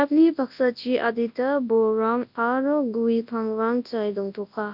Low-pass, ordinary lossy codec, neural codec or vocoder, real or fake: 5.4 kHz; none; codec, 24 kHz, 0.9 kbps, WavTokenizer, large speech release; fake